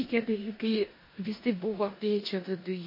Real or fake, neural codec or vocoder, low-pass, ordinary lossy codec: fake; codec, 16 kHz in and 24 kHz out, 0.9 kbps, LongCat-Audio-Codec, four codebook decoder; 5.4 kHz; MP3, 24 kbps